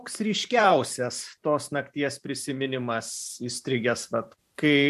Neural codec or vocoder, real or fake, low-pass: vocoder, 44.1 kHz, 128 mel bands every 512 samples, BigVGAN v2; fake; 14.4 kHz